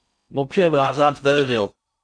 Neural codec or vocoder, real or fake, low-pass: codec, 16 kHz in and 24 kHz out, 0.6 kbps, FocalCodec, streaming, 4096 codes; fake; 9.9 kHz